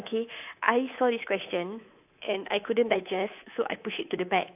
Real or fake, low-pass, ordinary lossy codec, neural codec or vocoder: fake; 3.6 kHz; AAC, 32 kbps; codec, 24 kHz, 3.1 kbps, DualCodec